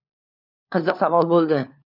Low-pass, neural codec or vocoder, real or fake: 5.4 kHz; codec, 16 kHz, 4 kbps, FunCodec, trained on LibriTTS, 50 frames a second; fake